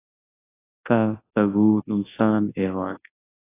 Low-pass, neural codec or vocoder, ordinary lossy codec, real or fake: 3.6 kHz; codec, 24 kHz, 0.9 kbps, WavTokenizer, large speech release; AAC, 16 kbps; fake